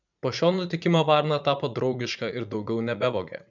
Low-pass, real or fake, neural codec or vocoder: 7.2 kHz; fake; vocoder, 44.1 kHz, 80 mel bands, Vocos